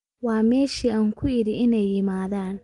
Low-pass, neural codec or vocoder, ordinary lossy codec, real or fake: 10.8 kHz; none; Opus, 32 kbps; real